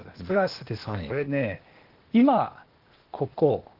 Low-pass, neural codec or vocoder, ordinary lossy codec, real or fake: 5.4 kHz; codec, 16 kHz, 0.8 kbps, ZipCodec; Opus, 16 kbps; fake